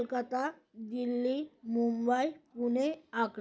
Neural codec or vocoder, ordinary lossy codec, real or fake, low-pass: none; none; real; none